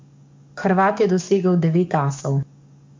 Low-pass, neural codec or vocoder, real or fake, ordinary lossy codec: 7.2 kHz; codec, 44.1 kHz, 7.8 kbps, DAC; fake; MP3, 64 kbps